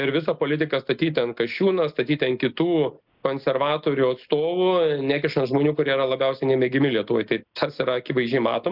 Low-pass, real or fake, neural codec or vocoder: 5.4 kHz; real; none